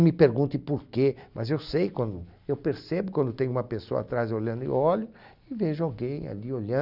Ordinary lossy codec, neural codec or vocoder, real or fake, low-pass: none; none; real; 5.4 kHz